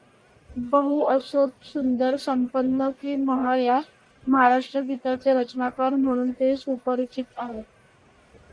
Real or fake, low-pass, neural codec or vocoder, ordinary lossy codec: fake; 9.9 kHz; codec, 44.1 kHz, 1.7 kbps, Pupu-Codec; Opus, 64 kbps